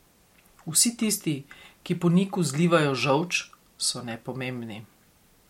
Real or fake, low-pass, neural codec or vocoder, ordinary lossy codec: real; 19.8 kHz; none; MP3, 64 kbps